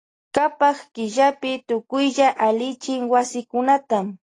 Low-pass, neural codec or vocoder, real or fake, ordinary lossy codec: 10.8 kHz; none; real; AAC, 32 kbps